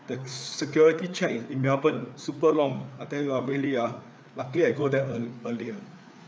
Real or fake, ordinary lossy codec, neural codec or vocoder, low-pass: fake; none; codec, 16 kHz, 4 kbps, FreqCodec, larger model; none